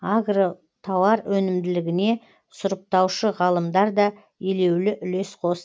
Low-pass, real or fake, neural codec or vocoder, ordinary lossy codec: none; real; none; none